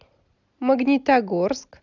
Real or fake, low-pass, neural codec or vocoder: real; 7.2 kHz; none